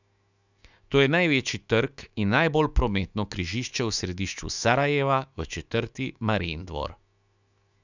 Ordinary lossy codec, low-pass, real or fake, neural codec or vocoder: none; 7.2 kHz; fake; autoencoder, 48 kHz, 128 numbers a frame, DAC-VAE, trained on Japanese speech